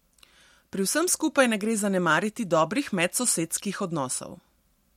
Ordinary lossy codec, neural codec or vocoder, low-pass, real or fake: MP3, 64 kbps; none; 19.8 kHz; real